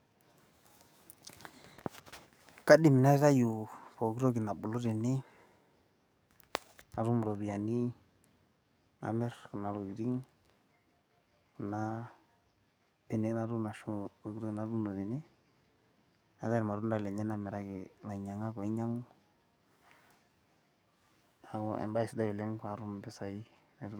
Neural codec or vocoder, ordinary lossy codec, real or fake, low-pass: codec, 44.1 kHz, 7.8 kbps, DAC; none; fake; none